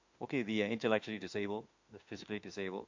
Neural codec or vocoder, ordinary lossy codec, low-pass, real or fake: autoencoder, 48 kHz, 32 numbers a frame, DAC-VAE, trained on Japanese speech; MP3, 48 kbps; 7.2 kHz; fake